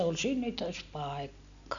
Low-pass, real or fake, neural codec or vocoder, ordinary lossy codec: 7.2 kHz; real; none; none